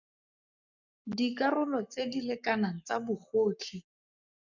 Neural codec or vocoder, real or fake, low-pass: codec, 44.1 kHz, 7.8 kbps, Pupu-Codec; fake; 7.2 kHz